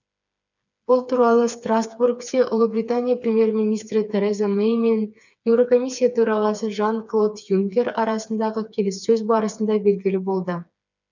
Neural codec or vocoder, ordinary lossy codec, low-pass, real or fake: codec, 16 kHz, 4 kbps, FreqCodec, smaller model; none; 7.2 kHz; fake